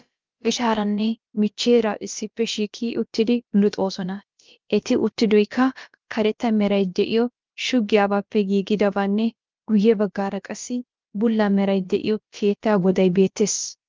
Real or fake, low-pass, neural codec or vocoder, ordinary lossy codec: fake; 7.2 kHz; codec, 16 kHz, about 1 kbps, DyCAST, with the encoder's durations; Opus, 24 kbps